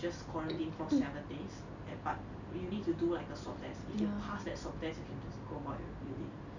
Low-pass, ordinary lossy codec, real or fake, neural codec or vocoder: 7.2 kHz; none; real; none